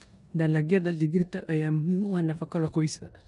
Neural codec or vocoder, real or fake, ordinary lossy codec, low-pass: codec, 16 kHz in and 24 kHz out, 0.9 kbps, LongCat-Audio-Codec, four codebook decoder; fake; none; 10.8 kHz